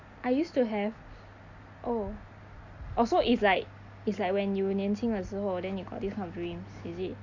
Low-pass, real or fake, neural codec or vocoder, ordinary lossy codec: 7.2 kHz; real; none; none